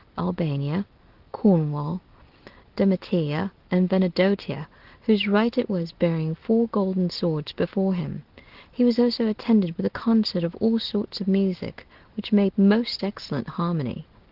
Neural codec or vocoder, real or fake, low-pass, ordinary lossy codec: none; real; 5.4 kHz; Opus, 16 kbps